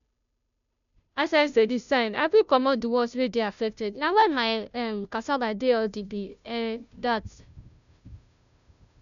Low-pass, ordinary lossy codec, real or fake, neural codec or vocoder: 7.2 kHz; none; fake; codec, 16 kHz, 0.5 kbps, FunCodec, trained on Chinese and English, 25 frames a second